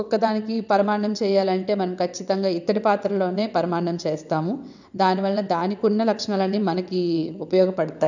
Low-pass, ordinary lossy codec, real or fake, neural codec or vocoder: 7.2 kHz; none; fake; vocoder, 22.05 kHz, 80 mel bands, WaveNeXt